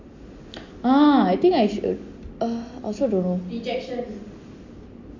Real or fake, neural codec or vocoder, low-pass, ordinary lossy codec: real; none; 7.2 kHz; MP3, 64 kbps